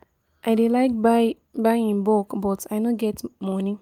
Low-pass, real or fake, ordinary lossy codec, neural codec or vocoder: 19.8 kHz; real; Opus, 32 kbps; none